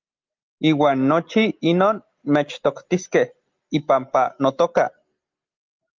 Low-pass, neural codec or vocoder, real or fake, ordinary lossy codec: 7.2 kHz; none; real; Opus, 32 kbps